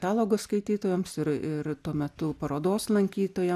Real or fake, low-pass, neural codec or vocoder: real; 14.4 kHz; none